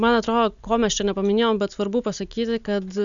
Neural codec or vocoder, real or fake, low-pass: none; real; 7.2 kHz